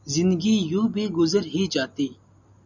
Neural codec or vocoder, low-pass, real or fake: none; 7.2 kHz; real